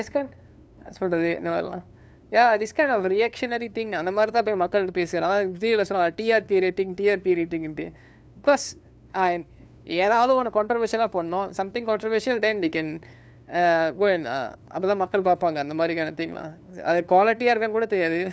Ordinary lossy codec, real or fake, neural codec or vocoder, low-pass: none; fake; codec, 16 kHz, 2 kbps, FunCodec, trained on LibriTTS, 25 frames a second; none